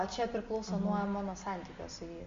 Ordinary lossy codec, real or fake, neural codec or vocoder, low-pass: MP3, 48 kbps; real; none; 7.2 kHz